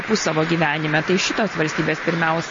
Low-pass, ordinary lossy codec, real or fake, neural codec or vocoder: 7.2 kHz; MP3, 32 kbps; real; none